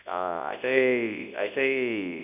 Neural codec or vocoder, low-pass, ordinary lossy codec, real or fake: codec, 24 kHz, 0.9 kbps, WavTokenizer, large speech release; 3.6 kHz; none; fake